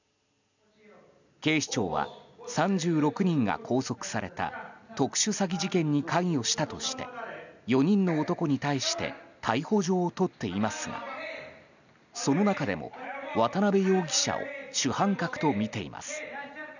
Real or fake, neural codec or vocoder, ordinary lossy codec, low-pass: real; none; none; 7.2 kHz